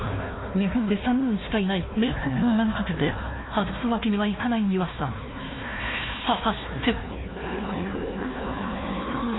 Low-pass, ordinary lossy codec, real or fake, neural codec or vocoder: 7.2 kHz; AAC, 16 kbps; fake; codec, 16 kHz, 1 kbps, FunCodec, trained on Chinese and English, 50 frames a second